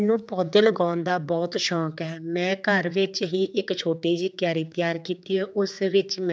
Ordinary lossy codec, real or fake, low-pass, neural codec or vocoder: none; fake; none; codec, 16 kHz, 4 kbps, X-Codec, HuBERT features, trained on general audio